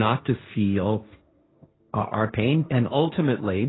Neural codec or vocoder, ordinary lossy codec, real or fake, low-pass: codec, 16 kHz, 1.1 kbps, Voila-Tokenizer; AAC, 16 kbps; fake; 7.2 kHz